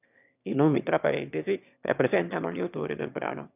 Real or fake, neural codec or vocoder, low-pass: fake; autoencoder, 22.05 kHz, a latent of 192 numbers a frame, VITS, trained on one speaker; 3.6 kHz